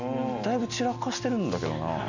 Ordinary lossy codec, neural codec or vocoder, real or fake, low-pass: none; none; real; 7.2 kHz